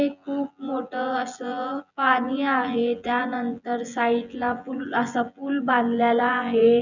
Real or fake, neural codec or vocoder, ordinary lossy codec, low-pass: fake; vocoder, 24 kHz, 100 mel bands, Vocos; none; 7.2 kHz